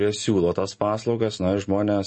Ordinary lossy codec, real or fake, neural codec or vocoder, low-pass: MP3, 32 kbps; real; none; 10.8 kHz